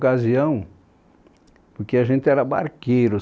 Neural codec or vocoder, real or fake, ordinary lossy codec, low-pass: none; real; none; none